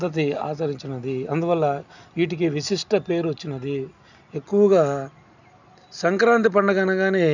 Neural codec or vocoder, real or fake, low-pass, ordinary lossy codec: none; real; 7.2 kHz; none